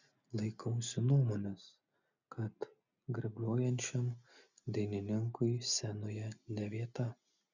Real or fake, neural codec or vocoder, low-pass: real; none; 7.2 kHz